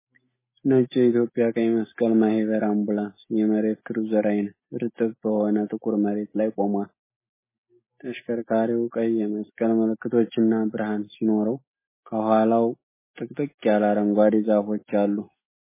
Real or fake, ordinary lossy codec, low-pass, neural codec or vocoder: real; MP3, 16 kbps; 3.6 kHz; none